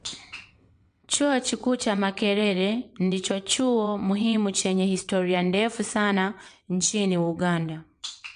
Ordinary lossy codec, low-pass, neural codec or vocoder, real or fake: MP3, 64 kbps; 9.9 kHz; vocoder, 22.05 kHz, 80 mel bands, WaveNeXt; fake